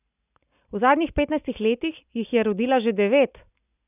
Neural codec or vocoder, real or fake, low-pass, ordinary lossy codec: none; real; 3.6 kHz; none